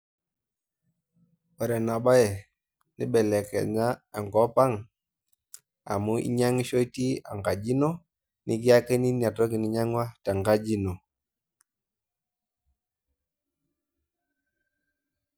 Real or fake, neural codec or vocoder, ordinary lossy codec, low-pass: real; none; none; none